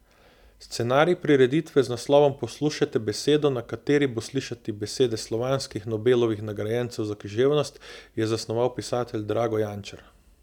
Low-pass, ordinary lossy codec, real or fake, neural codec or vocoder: 19.8 kHz; none; real; none